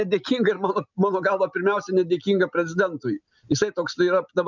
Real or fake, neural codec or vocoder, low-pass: real; none; 7.2 kHz